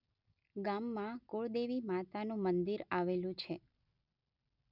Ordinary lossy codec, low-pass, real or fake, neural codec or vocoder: none; 5.4 kHz; real; none